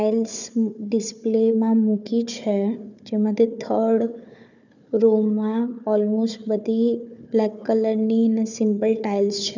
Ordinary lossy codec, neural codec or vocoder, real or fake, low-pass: none; codec, 16 kHz, 4 kbps, FunCodec, trained on Chinese and English, 50 frames a second; fake; 7.2 kHz